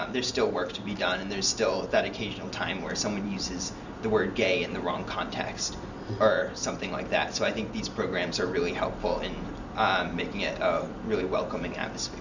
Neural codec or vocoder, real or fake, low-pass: none; real; 7.2 kHz